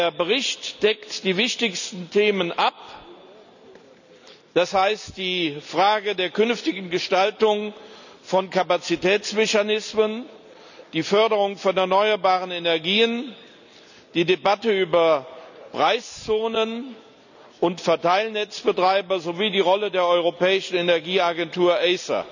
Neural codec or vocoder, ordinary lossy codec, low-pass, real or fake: none; none; 7.2 kHz; real